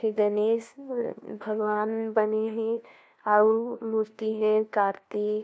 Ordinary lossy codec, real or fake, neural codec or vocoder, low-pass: none; fake; codec, 16 kHz, 1 kbps, FunCodec, trained on LibriTTS, 50 frames a second; none